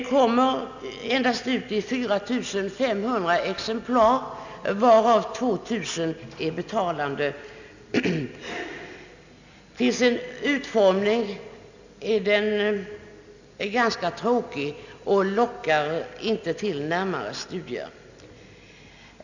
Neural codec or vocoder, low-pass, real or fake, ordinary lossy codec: none; 7.2 kHz; real; none